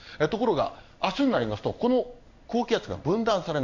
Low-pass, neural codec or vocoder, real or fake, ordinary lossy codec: 7.2 kHz; codec, 16 kHz in and 24 kHz out, 1 kbps, XY-Tokenizer; fake; none